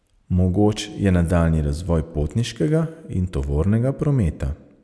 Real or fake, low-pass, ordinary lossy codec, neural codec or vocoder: real; none; none; none